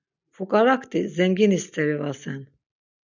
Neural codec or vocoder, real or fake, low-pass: none; real; 7.2 kHz